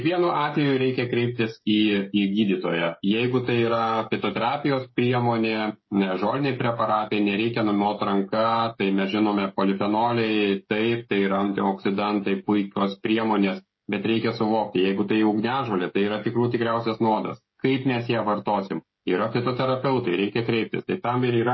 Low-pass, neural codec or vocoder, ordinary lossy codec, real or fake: 7.2 kHz; codec, 16 kHz, 16 kbps, FreqCodec, smaller model; MP3, 24 kbps; fake